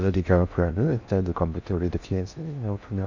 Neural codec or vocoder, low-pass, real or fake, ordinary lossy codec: codec, 16 kHz in and 24 kHz out, 0.6 kbps, FocalCodec, streaming, 4096 codes; 7.2 kHz; fake; none